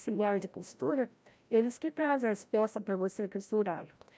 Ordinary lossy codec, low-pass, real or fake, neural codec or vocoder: none; none; fake; codec, 16 kHz, 0.5 kbps, FreqCodec, larger model